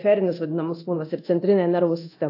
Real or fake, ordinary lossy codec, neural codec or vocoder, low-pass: fake; AAC, 32 kbps; codec, 24 kHz, 0.9 kbps, DualCodec; 5.4 kHz